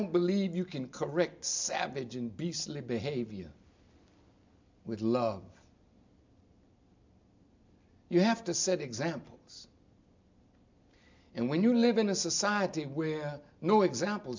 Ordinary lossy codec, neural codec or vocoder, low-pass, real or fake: MP3, 64 kbps; none; 7.2 kHz; real